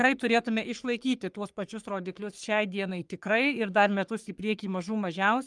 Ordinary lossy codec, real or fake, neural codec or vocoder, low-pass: Opus, 32 kbps; fake; codec, 44.1 kHz, 3.4 kbps, Pupu-Codec; 10.8 kHz